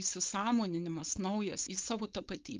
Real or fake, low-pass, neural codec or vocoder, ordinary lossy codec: fake; 7.2 kHz; codec, 16 kHz, 4.8 kbps, FACodec; Opus, 32 kbps